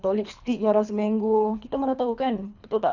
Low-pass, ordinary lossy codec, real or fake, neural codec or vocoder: 7.2 kHz; none; fake; codec, 24 kHz, 3 kbps, HILCodec